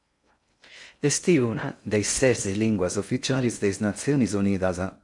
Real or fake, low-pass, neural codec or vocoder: fake; 10.8 kHz; codec, 16 kHz in and 24 kHz out, 0.6 kbps, FocalCodec, streaming, 2048 codes